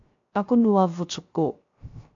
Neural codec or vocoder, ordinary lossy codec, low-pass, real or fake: codec, 16 kHz, 0.3 kbps, FocalCodec; AAC, 48 kbps; 7.2 kHz; fake